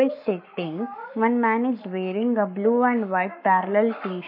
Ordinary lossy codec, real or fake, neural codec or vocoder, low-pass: none; fake; codec, 44.1 kHz, 7.8 kbps, Pupu-Codec; 5.4 kHz